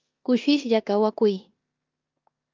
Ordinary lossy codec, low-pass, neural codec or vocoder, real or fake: Opus, 24 kbps; 7.2 kHz; codec, 16 kHz in and 24 kHz out, 0.9 kbps, LongCat-Audio-Codec, four codebook decoder; fake